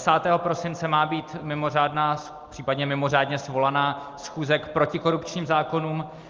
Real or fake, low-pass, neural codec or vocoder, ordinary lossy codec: real; 7.2 kHz; none; Opus, 32 kbps